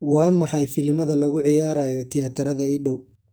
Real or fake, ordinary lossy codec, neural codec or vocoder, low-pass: fake; none; codec, 44.1 kHz, 2.6 kbps, SNAC; none